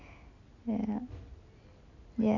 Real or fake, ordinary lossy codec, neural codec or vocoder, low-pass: real; AAC, 48 kbps; none; 7.2 kHz